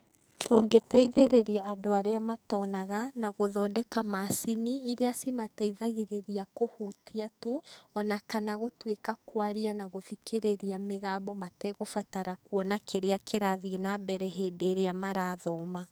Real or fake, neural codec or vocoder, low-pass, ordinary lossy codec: fake; codec, 44.1 kHz, 2.6 kbps, SNAC; none; none